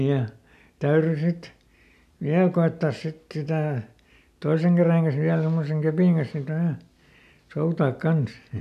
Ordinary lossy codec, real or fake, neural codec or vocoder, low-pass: none; real; none; 14.4 kHz